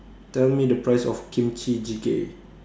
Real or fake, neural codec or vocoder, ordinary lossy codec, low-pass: real; none; none; none